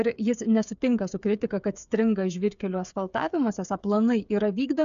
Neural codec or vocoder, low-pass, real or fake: codec, 16 kHz, 8 kbps, FreqCodec, smaller model; 7.2 kHz; fake